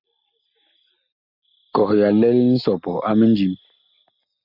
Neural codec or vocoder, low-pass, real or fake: none; 5.4 kHz; real